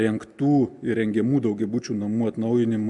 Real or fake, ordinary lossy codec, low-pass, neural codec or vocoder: real; MP3, 96 kbps; 10.8 kHz; none